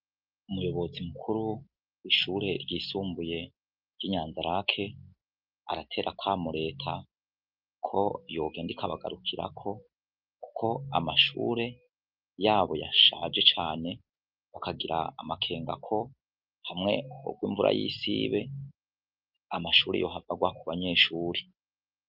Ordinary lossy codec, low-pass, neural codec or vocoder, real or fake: Opus, 24 kbps; 5.4 kHz; none; real